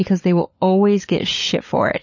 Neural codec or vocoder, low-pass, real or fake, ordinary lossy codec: codec, 16 kHz, 16 kbps, FunCodec, trained on LibriTTS, 50 frames a second; 7.2 kHz; fake; MP3, 32 kbps